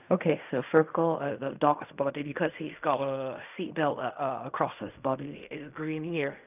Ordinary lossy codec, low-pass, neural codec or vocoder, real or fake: none; 3.6 kHz; codec, 16 kHz in and 24 kHz out, 0.4 kbps, LongCat-Audio-Codec, fine tuned four codebook decoder; fake